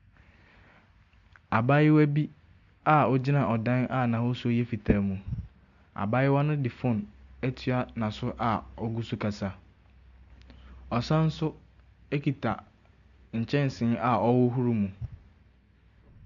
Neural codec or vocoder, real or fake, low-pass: none; real; 7.2 kHz